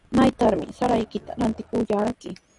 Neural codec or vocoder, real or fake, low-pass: none; real; 10.8 kHz